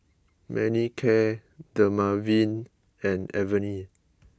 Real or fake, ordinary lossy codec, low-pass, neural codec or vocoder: real; none; none; none